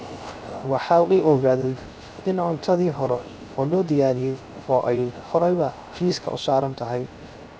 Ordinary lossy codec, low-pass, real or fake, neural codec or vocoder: none; none; fake; codec, 16 kHz, 0.3 kbps, FocalCodec